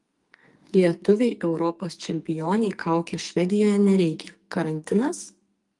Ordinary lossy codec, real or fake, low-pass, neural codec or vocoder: Opus, 32 kbps; fake; 10.8 kHz; codec, 44.1 kHz, 2.6 kbps, SNAC